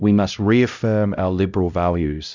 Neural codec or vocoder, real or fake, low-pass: codec, 16 kHz, 0.5 kbps, X-Codec, HuBERT features, trained on LibriSpeech; fake; 7.2 kHz